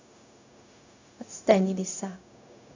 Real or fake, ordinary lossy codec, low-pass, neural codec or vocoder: fake; AAC, 48 kbps; 7.2 kHz; codec, 16 kHz, 0.4 kbps, LongCat-Audio-Codec